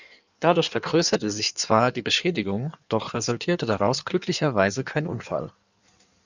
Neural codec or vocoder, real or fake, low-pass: codec, 16 kHz in and 24 kHz out, 1.1 kbps, FireRedTTS-2 codec; fake; 7.2 kHz